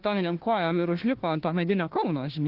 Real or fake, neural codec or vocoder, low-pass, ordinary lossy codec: fake; codec, 16 kHz, 1 kbps, FunCodec, trained on Chinese and English, 50 frames a second; 5.4 kHz; Opus, 16 kbps